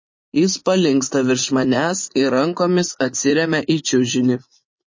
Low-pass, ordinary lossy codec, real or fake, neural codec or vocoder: 7.2 kHz; MP3, 32 kbps; fake; vocoder, 44.1 kHz, 80 mel bands, Vocos